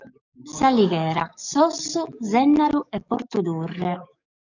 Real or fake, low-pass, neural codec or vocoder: fake; 7.2 kHz; codec, 44.1 kHz, 7.8 kbps, DAC